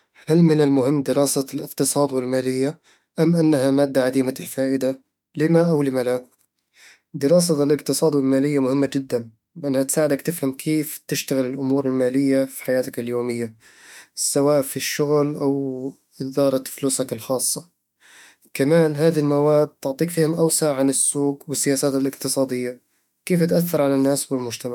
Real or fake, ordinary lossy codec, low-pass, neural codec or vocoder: fake; none; 19.8 kHz; autoencoder, 48 kHz, 32 numbers a frame, DAC-VAE, trained on Japanese speech